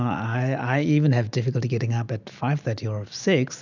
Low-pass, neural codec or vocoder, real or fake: 7.2 kHz; none; real